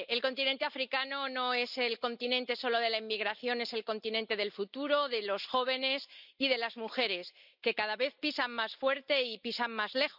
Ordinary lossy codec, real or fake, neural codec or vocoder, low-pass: none; real; none; 5.4 kHz